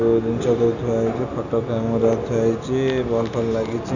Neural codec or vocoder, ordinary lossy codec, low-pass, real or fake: none; none; 7.2 kHz; real